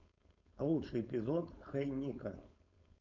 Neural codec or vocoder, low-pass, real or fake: codec, 16 kHz, 4.8 kbps, FACodec; 7.2 kHz; fake